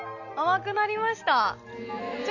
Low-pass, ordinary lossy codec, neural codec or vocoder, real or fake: 7.2 kHz; none; none; real